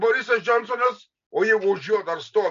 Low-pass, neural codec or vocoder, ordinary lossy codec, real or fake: 7.2 kHz; none; MP3, 48 kbps; real